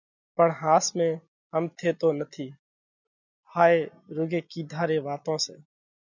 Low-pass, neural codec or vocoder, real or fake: 7.2 kHz; none; real